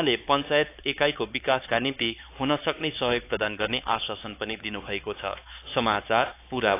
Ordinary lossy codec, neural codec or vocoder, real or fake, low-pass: AAC, 24 kbps; codec, 16 kHz, 4 kbps, X-Codec, HuBERT features, trained on LibriSpeech; fake; 3.6 kHz